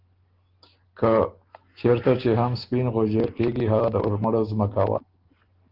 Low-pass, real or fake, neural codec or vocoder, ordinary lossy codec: 5.4 kHz; real; none; Opus, 16 kbps